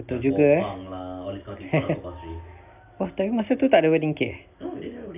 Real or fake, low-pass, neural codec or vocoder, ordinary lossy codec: real; 3.6 kHz; none; AAC, 32 kbps